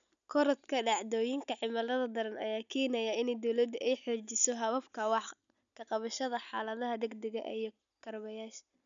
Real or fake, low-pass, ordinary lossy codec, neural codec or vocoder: real; 7.2 kHz; none; none